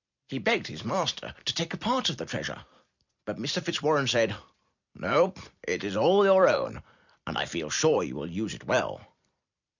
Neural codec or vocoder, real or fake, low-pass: vocoder, 44.1 kHz, 128 mel bands every 256 samples, BigVGAN v2; fake; 7.2 kHz